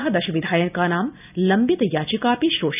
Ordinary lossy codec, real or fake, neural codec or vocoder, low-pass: none; real; none; 3.6 kHz